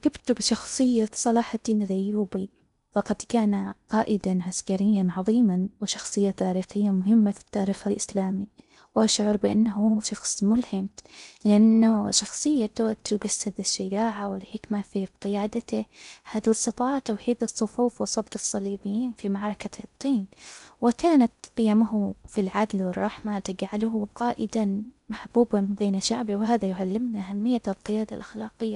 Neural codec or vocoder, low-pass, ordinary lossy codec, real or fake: codec, 16 kHz in and 24 kHz out, 0.6 kbps, FocalCodec, streaming, 2048 codes; 10.8 kHz; none; fake